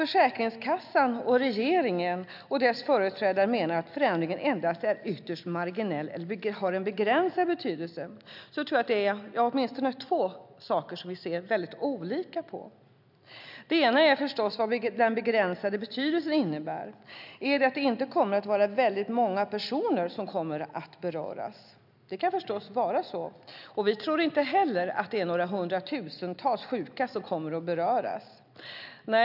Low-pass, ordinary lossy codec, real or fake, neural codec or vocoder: 5.4 kHz; none; real; none